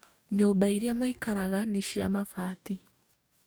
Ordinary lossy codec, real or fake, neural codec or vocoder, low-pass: none; fake; codec, 44.1 kHz, 2.6 kbps, DAC; none